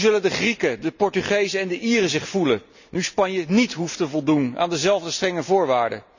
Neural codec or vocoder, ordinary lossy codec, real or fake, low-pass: none; none; real; 7.2 kHz